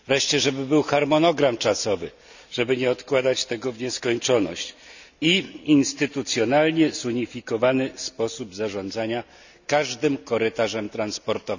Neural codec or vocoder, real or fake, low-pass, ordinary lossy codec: none; real; 7.2 kHz; none